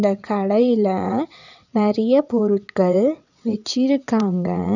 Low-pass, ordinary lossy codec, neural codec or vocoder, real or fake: 7.2 kHz; none; vocoder, 22.05 kHz, 80 mel bands, WaveNeXt; fake